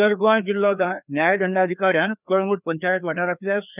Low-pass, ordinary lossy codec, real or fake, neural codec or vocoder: 3.6 kHz; none; fake; codec, 16 kHz, 2 kbps, FreqCodec, larger model